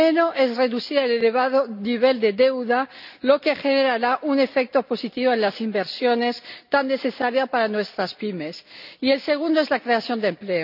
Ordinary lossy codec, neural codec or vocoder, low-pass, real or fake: none; none; 5.4 kHz; real